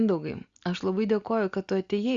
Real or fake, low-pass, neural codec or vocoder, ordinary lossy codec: real; 7.2 kHz; none; Opus, 64 kbps